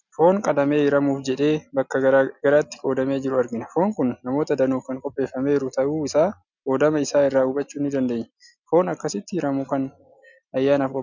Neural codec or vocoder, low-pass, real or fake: none; 7.2 kHz; real